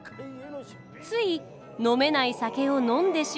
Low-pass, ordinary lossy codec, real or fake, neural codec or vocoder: none; none; real; none